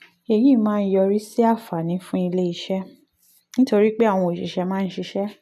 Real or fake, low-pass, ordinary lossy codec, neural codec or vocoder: real; 14.4 kHz; none; none